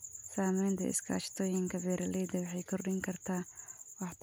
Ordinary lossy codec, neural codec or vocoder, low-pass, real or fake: none; none; none; real